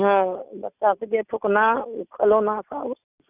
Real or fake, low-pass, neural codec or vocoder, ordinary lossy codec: real; 3.6 kHz; none; none